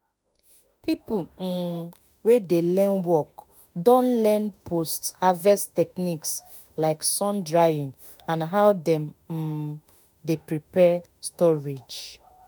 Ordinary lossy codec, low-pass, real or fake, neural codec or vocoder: none; none; fake; autoencoder, 48 kHz, 32 numbers a frame, DAC-VAE, trained on Japanese speech